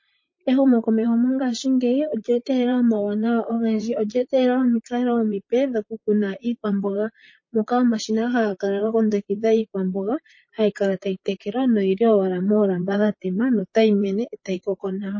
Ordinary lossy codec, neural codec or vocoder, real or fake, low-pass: MP3, 32 kbps; vocoder, 44.1 kHz, 128 mel bands, Pupu-Vocoder; fake; 7.2 kHz